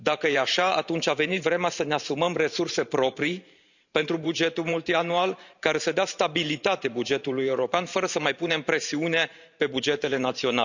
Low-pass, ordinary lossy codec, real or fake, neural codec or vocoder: 7.2 kHz; none; fake; vocoder, 44.1 kHz, 128 mel bands every 512 samples, BigVGAN v2